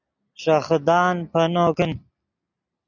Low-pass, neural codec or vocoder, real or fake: 7.2 kHz; none; real